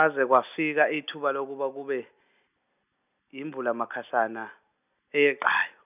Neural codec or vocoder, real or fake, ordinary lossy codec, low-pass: none; real; none; 3.6 kHz